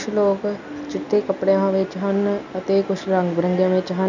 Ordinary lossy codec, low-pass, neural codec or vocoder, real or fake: none; 7.2 kHz; none; real